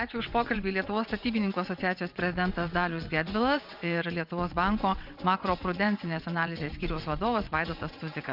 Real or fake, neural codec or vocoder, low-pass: fake; vocoder, 22.05 kHz, 80 mel bands, WaveNeXt; 5.4 kHz